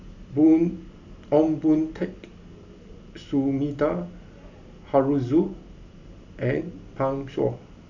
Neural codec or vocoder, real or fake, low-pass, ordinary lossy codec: none; real; 7.2 kHz; none